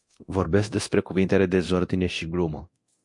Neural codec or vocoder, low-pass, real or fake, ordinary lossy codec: codec, 24 kHz, 0.9 kbps, DualCodec; 10.8 kHz; fake; MP3, 48 kbps